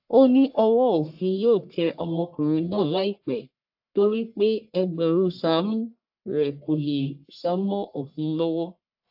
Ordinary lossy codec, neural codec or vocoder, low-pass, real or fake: AAC, 48 kbps; codec, 44.1 kHz, 1.7 kbps, Pupu-Codec; 5.4 kHz; fake